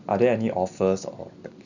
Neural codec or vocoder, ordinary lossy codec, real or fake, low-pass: none; none; real; 7.2 kHz